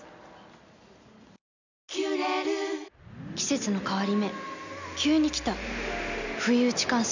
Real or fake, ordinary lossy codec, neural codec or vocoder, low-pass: real; none; none; 7.2 kHz